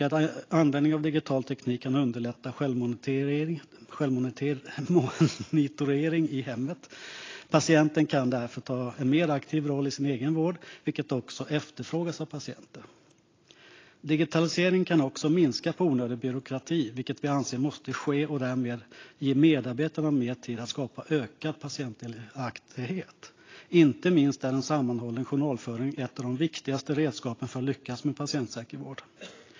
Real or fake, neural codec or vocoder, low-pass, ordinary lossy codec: real; none; 7.2 kHz; AAC, 32 kbps